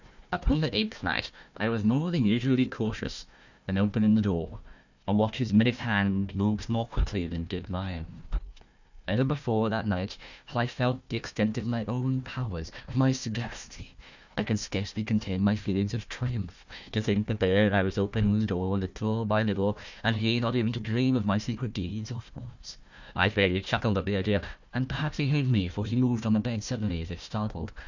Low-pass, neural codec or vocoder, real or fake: 7.2 kHz; codec, 16 kHz, 1 kbps, FunCodec, trained on Chinese and English, 50 frames a second; fake